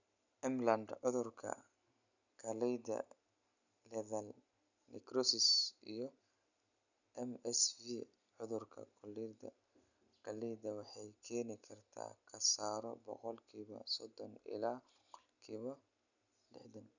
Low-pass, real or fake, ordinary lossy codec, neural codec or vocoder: 7.2 kHz; real; none; none